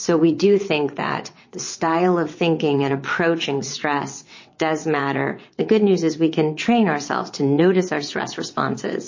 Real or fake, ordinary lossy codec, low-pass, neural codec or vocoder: fake; MP3, 32 kbps; 7.2 kHz; vocoder, 22.05 kHz, 80 mel bands, WaveNeXt